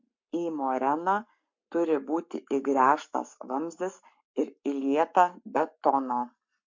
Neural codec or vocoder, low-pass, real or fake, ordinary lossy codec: codec, 44.1 kHz, 7.8 kbps, Pupu-Codec; 7.2 kHz; fake; MP3, 48 kbps